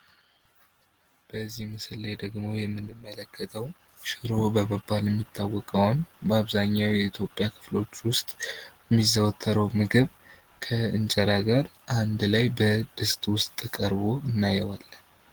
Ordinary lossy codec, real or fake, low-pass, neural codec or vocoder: Opus, 16 kbps; real; 19.8 kHz; none